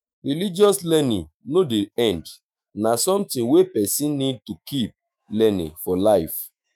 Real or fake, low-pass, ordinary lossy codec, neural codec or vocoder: fake; none; none; autoencoder, 48 kHz, 128 numbers a frame, DAC-VAE, trained on Japanese speech